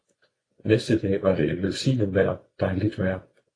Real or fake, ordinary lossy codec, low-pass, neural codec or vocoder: fake; AAC, 32 kbps; 9.9 kHz; vocoder, 24 kHz, 100 mel bands, Vocos